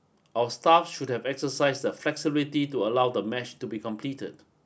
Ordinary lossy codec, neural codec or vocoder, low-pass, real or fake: none; none; none; real